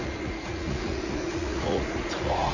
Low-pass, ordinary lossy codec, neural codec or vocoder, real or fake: 7.2 kHz; none; vocoder, 44.1 kHz, 80 mel bands, Vocos; fake